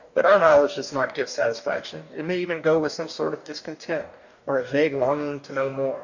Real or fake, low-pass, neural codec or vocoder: fake; 7.2 kHz; codec, 44.1 kHz, 2.6 kbps, DAC